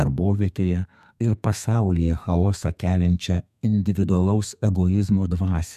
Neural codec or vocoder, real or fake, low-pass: codec, 32 kHz, 1.9 kbps, SNAC; fake; 14.4 kHz